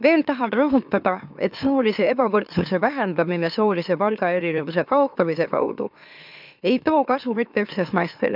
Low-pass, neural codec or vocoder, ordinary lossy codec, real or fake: 5.4 kHz; autoencoder, 44.1 kHz, a latent of 192 numbers a frame, MeloTTS; none; fake